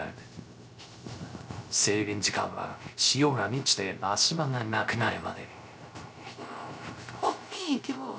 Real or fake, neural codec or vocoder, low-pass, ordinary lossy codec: fake; codec, 16 kHz, 0.3 kbps, FocalCodec; none; none